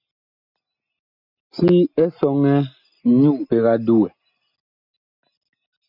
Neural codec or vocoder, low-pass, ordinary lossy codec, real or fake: none; 5.4 kHz; MP3, 48 kbps; real